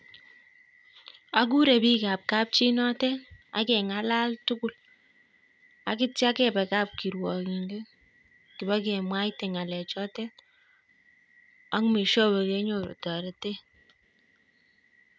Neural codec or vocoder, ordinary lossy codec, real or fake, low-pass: none; none; real; none